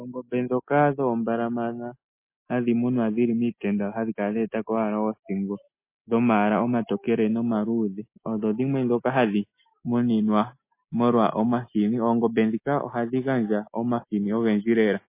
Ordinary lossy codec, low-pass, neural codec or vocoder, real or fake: MP3, 24 kbps; 3.6 kHz; none; real